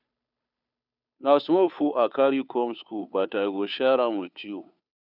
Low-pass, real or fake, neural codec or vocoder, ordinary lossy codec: 5.4 kHz; fake; codec, 16 kHz, 2 kbps, FunCodec, trained on Chinese and English, 25 frames a second; none